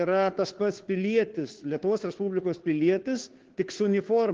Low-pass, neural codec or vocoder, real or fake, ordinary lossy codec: 7.2 kHz; codec, 16 kHz, 2 kbps, FunCodec, trained on Chinese and English, 25 frames a second; fake; Opus, 16 kbps